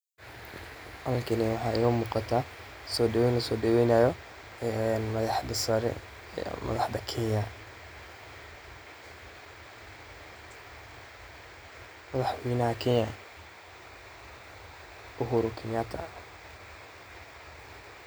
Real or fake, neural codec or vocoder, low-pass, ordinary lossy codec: real; none; none; none